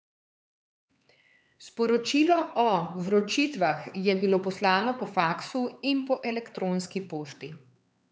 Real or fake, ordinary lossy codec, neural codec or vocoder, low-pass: fake; none; codec, 16 kHz, 4 kbps, X-Codec, HuBERT features, trained on LibriSpeech; none